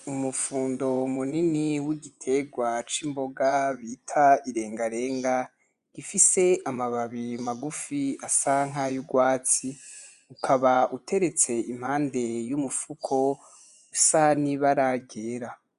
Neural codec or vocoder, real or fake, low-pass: vocoder, 24 kHz, 100 mel bands, Vocos; fake; 10.8 kHz